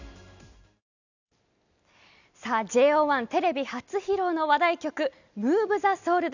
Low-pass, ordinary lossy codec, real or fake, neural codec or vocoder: 7.2 kHz; none; real; none